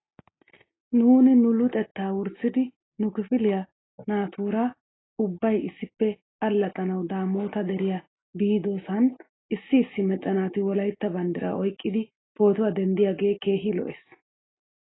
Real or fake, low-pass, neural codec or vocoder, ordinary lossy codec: real; 7.2 kHz; none; AAC, 16 kbps